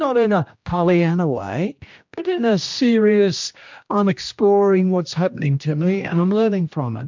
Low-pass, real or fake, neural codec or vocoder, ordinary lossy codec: 7.2 kHz; fake; codec, 16 kHz, 1 kbps, X-Codec, HuBERT features, trained on general audio; MP3, 64 kbps